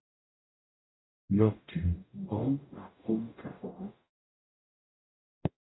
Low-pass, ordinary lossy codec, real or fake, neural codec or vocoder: 7.2 kHz; AAC, 16 kbps; fake; codec, 44.1 kHz, 0.9 kbps, DAC